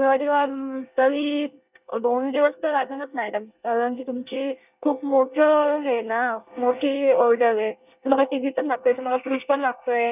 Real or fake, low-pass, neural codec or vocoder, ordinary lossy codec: fake; 3.6 kHz; codec, 24 kHz, 1 kbps, SNAC; none